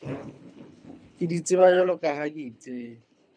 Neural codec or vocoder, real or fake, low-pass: codec, 24 kHz, 3 kbps, HILCodec; fake; 9.9 kHz